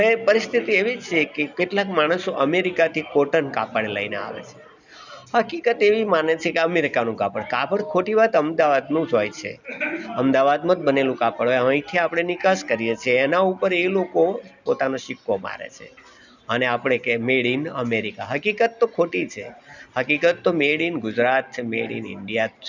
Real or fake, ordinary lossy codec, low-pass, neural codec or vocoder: real; none; 7.2 kHz; none